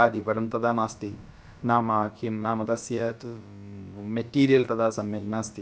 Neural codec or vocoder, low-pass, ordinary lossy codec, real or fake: codec, 16 kHz, about 1 kbps, DyCAST, with the encoder's durations; none; none; fake